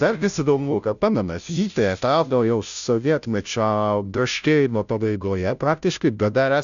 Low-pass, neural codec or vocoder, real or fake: 7.2 kHz; codec, 16 kHz, 0.5 kbps, FunCodec, trained on Chinese and English, 25 frames a second; fake